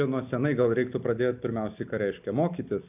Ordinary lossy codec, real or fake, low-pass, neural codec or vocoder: AAC, 32 kbps; real; 3.6 kHz; none